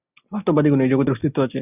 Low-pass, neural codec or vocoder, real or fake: 3.6 kHz; none; real